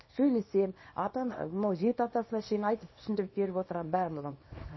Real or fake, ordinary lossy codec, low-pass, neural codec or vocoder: fake; MP3, 24 kbps; 7.2 kHz; codec, 24 kHz, 0.9 kbps, WavTokenizer, small release